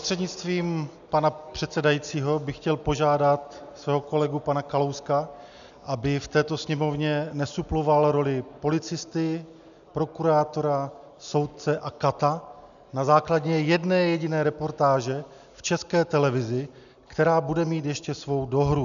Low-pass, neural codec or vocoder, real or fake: 7.2 kHz; none; real